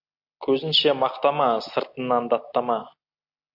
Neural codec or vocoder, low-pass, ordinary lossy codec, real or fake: none; 5.4 kHz; MP3, 48 kbps; real